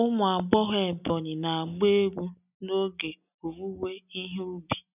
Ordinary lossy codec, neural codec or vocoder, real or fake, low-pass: none; none; real; 3.6 kHz